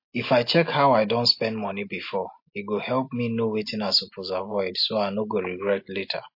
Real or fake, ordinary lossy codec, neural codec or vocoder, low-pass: real; MP3, 32 kbps; none; 5.4 kHz